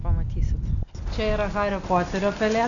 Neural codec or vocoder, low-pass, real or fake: none; 7.2 kHz; real